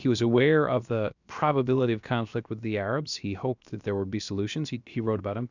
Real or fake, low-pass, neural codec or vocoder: fake; 7.2 kHz; codec, 16 kHz, 0.7 kbps, FocalCodec